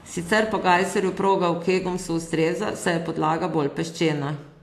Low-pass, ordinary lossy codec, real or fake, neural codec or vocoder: 14.4 kHz; AAC, 64 kbps; real; none